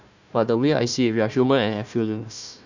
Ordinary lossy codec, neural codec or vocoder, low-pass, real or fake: none; codec, 16 kHz, 1 kbps, FunCodec, trained on Chinese and English, 50 frames a second; 7.2 kHz; fake